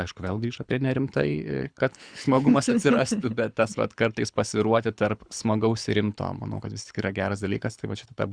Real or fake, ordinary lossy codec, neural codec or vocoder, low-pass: fake; Opus, 64 kbps; codec, 24 kHz, 6 kbps, HILCodec; 9.9 kHz